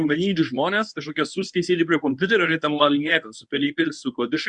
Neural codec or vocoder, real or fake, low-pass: codec, 24 kHz, 0.9 kbps, WavTokenizer, medium speech release version 1; fake; 10.8 kHz